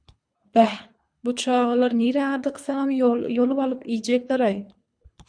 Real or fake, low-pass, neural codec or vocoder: fake; 9.9 kHz; codec, 24 kHz, 3 kbps, HILCodec